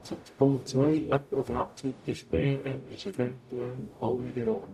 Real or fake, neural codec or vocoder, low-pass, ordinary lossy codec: fake; codec, 44.1 kHz, 0.9 kbps, DAC; 14.4 kHz; none